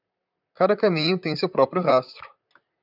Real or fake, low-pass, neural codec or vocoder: fake; 5.4 kHz; vocoder, 44.1 kHz, 128 mel bands, Pupu-Vocoder